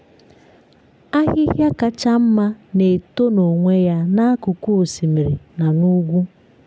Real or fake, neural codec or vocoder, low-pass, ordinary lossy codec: real; none; none; none